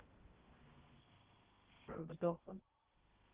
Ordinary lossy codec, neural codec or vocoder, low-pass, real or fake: Opus, 16 kbps; codec, 16 kHz in and 24 kHz out, 0.6 kbps, FocalCodec, streaming, 2048 codes; 3.6 kHz; fake